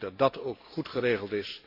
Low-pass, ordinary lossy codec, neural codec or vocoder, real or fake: 5.4 kHz; AAC, 32 kbps; none; real